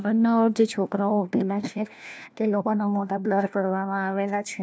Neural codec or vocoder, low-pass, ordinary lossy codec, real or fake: codec, 16 kHz, 1 kbps, FunCodec, trained on LibriTTS, 50 frames a second; none; none; fake